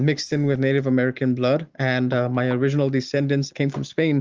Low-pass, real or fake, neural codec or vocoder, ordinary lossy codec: 7.2 kHz; real; none; Opus, 24 kbps